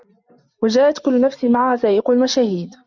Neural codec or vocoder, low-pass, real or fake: none; 7.2 kHz; real